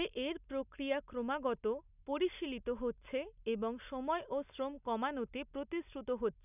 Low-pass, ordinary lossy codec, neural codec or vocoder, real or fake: 3.6 kHz; none; none; real